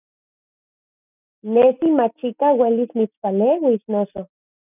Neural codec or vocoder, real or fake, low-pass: none; real; 3.6 kHz